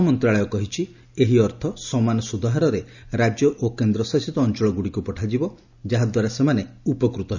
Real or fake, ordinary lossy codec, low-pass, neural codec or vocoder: real; none; 7.2 kHz; none